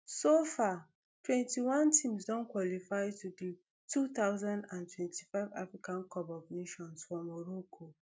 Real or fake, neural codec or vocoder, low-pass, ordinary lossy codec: real; none; none; none